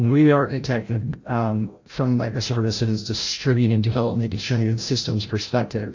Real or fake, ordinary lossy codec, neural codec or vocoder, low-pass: fake; AAC, 48 kbps; codec, 16 kHz, 0.5 kbps, FreqCodec, larger model; 7.2 kHz